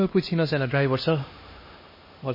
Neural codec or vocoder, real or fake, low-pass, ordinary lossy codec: codec, 16 kHz, 1 kbps, X-Codec, HuBERT features, trained on LibriSpeech; fake; 5.4 kHz; MP3, 24 kbps